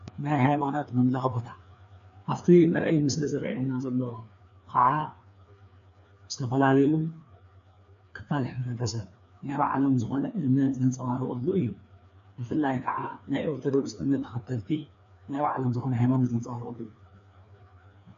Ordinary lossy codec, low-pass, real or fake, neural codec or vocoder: AAC, 96 kbps; 7.2 kHz; fake; codec, 16 kHz, 2 kbps, FreqCodec, larger model